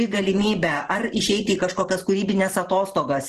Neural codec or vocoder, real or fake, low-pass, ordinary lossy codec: vocoder, 44.1 kHz, 128 mel bands every 512 samples, BigVGAN v2; fake; 14.4 kHz; Opus, 16 kbps